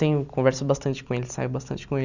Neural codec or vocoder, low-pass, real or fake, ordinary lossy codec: none; 7.2 kHz; real; none